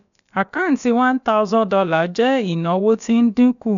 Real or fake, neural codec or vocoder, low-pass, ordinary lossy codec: fake; codec, 16 kHz, about 1 kbps, DyCAST, with the encoder's durations; 7.2 kHz; none